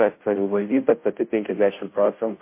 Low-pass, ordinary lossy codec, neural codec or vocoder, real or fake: 3.6 kHz; MP3, 24 kbps; codec, 16 kHz, 0.5 kbps, FunCodec, trained on Chinese and English, 25 frames a second; fake